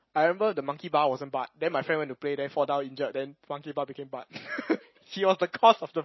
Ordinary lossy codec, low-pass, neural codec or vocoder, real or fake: MP3, 24 kbps; 7.2 kHz; none; real